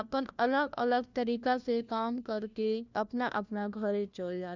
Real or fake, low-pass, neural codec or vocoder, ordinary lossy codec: fake; 7.2 kHz; codec, 16 kHz, 1 kbps, FunCodec, trained on LibriTTS, 50 frames a second; none